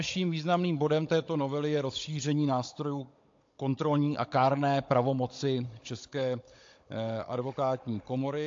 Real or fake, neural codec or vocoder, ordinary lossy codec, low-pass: fake; codec, 16 kHz, 16 kbps, FunCodec, trained on Chinese and English, 50 frames a second; AAC, 48 kbps; 7.2 kHz